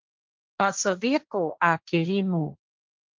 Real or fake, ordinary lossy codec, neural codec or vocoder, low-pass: fake; Opus, 32 kbps; codec, 16 kHz, 1.1 kbps, Voila-Tokenizer; 7.2 kHz